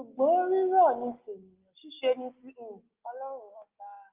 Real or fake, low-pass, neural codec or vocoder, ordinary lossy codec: real; 3.6 kHz; none; Opus, 32 kbps